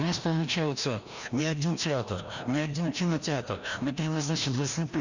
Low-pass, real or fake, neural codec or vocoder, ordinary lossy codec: 7.2 kHz; fake; codec, 16 kHz, 1 kbps, FreqCodec, larger model; none